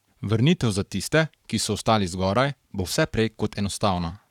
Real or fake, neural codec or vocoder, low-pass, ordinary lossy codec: fake; codec, 44.1 kHz, 7.8 kbps, Pupu-Codec; 19.8 kHz; none